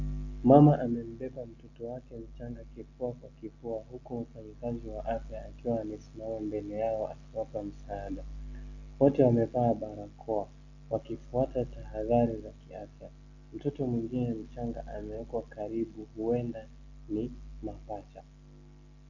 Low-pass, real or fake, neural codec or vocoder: 7.2 kHz; real; none